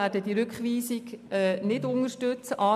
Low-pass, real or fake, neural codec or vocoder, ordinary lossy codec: 14.4 kHz; real; none; none